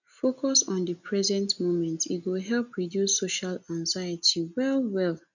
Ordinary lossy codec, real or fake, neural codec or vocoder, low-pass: none; real; none; 7.2 kHz